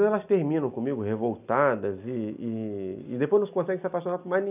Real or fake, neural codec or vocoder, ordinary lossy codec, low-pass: real; none; none; 3.6 kHz